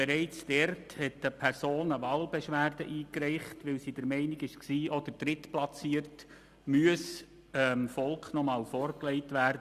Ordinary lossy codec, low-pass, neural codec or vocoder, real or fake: Opus, 64 kbps; 14.4 kHz; none; real